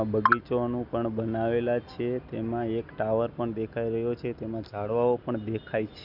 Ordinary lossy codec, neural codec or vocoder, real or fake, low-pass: none; none; real; 5.4 kHz